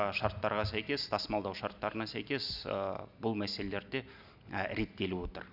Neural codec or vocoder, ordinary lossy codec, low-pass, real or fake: none; none; 5.4 kHz; real